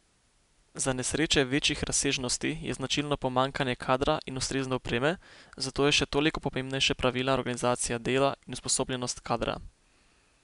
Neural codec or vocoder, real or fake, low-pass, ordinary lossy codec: none; real; 10.8 kHz; MP3, 96 kbps